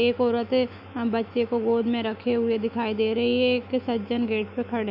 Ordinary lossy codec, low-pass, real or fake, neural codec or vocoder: none; 5.4 kHz; fake; autoencoder, 48 kHz, 128 numbers a frame, DAC-VAE, trained on Japanese speech